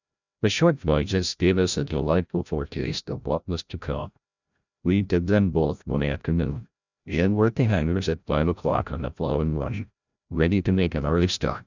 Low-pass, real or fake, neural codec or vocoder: 7.2 kHz; fake; codec, 16 kHz, 0.5 kbps, FreqCodec, larger model